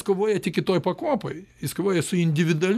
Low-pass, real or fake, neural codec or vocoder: 14.4 kHz; real; none